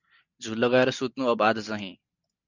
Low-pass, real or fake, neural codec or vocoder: 7.2 kHz; real; none